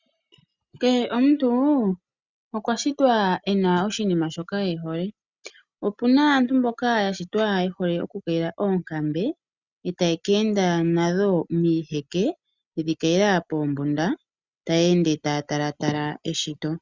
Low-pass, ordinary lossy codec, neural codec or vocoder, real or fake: 7.2 kHz; Opus, 64 kbps; none; real